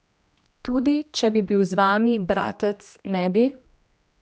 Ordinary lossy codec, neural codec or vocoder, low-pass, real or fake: none; codec, 16 kHz, 1 kbps, X-Codec, HuBERT features, trained on general audio; none; fake